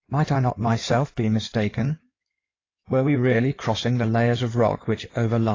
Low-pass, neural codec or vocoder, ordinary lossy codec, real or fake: 7.2 kHz; codec, 16 kHz in and 24 kHz out, 2.2 kbps, FireRedTTS-2 codec; AAC, 32 kbps; fake